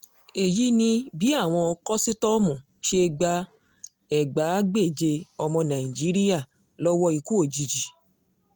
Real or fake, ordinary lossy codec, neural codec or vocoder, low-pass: real; Opus, 24 kbps; none; 19.8 kHz